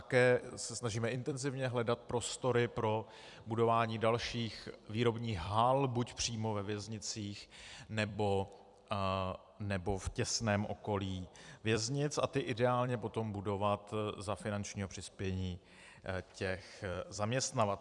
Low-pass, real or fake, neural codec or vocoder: 10.8 kHz; fake; vocoder, 44.1 kHz, 128 mel bands every 256 samples, BigVGAN v2